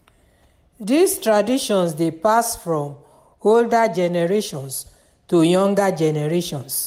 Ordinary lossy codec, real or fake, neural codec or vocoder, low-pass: MP3, 96 kbps; real; none; 19.8 kHz